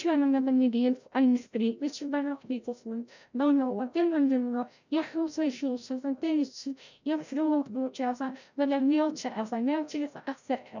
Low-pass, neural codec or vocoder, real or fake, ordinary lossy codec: 7.2 kHz; codec, 16 kHz, 0.5 kbps, FreqCodec, larger model; fake; none